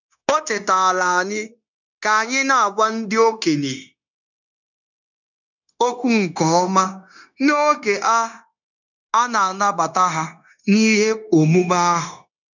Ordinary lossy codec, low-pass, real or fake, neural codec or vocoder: none; 7.2 kHz; fake; codec, 16 kHz, 0.9 kbps, LongCat-Audio-Codec